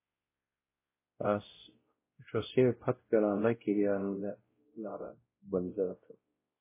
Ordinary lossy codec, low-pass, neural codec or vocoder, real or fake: MP3, 16 kbps; 3.6 kHz; codec, 16 kHz, 0.5 kbps, X-Codec, WavLM features, trained on Multilingual LibriSpeech; fake